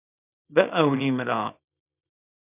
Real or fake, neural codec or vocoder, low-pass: fake; codec, 24 kHz, 0.9 kbps, WavTokenizer, small release; 3.6 kHz